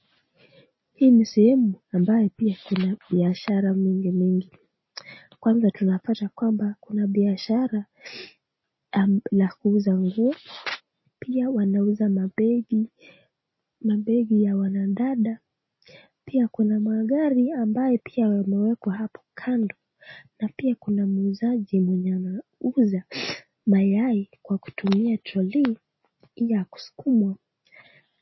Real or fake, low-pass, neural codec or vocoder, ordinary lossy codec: real; 7.2 kHz; none; MP3, 24 kbps